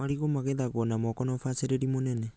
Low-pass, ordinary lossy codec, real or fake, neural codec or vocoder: none; none; real; none